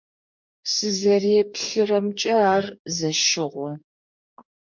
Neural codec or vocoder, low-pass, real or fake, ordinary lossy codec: codec, 44.1 kHz, 2.6 kbps, DAC; 7.2 kHz; fake; MP3, 48 kbps